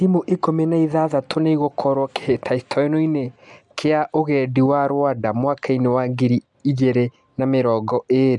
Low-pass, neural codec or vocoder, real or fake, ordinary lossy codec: 10.8 kHz; none; real; MP3, 96 kbps